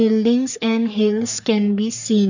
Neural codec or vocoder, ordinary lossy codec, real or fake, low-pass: codec, 44.1 kHz, 3.4 kbps, Pupu-Codec; none; fake; 7.2 kHz